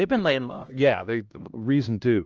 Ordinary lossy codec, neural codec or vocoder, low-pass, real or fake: Opus, 24 kbps; codec, 16 kHz, 1 kbps, X-Codec, HuBERT features, trained on LibriSpeech; 7.2 kHz; fake